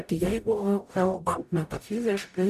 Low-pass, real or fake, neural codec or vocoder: 14.4 kHz; fake; codec, 44.1 kHz, 0.9 kbps, DAC